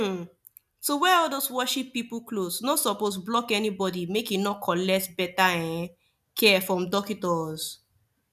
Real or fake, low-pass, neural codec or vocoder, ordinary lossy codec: real; 14.4 kHz; none; none